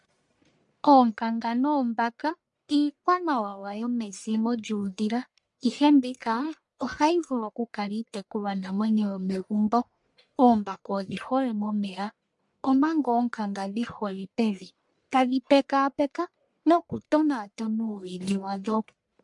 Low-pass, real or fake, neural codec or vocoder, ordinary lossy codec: 10.8 kHz; fake; codec, 44.1 kHz, 1.7 kbps, Pupu-Codec; MP3, 64 kbps